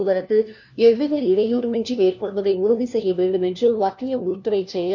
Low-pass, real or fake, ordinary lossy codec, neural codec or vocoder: 7.2 kHz; fake; none; codec, 16 kHz, 1 kbps, FunCodec, trained on LibriTTS, 50 frames a second